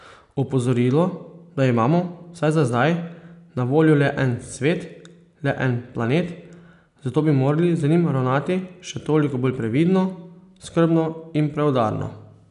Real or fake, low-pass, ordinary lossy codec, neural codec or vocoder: real; 10.8 kHz; none; none